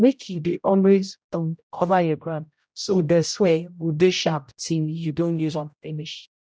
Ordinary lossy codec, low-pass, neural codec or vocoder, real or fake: none; none; codec, 16 kHz, 0.5 kbps, X-Codec, HuBERT features, trained on general audio; fake